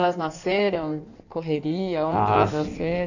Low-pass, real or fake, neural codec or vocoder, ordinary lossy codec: 7.2 kHz; fake; codec, 16 kHz in and 24 kHz out, 1.1 kbps, FireRedTTS-2 codec; none